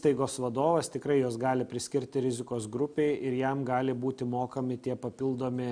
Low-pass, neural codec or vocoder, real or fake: 9.9 kHz; none; real